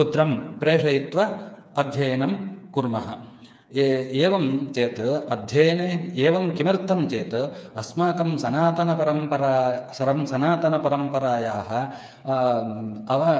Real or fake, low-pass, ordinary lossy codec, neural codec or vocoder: fake; none; none; codec, 16 kHz, 4 kbps, FreqCodec, smaller model